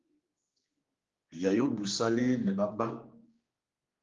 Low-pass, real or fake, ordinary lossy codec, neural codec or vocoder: 7.2 kHz; fake; Opus, 32 kbps; codec, 16 kHz, 2 kbps, X-Codec, HuBERT features, trained on general audio